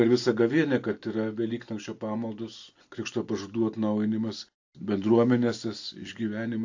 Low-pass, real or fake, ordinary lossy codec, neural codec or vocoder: 7.2 kHz; real; AAC, 48 kbps; none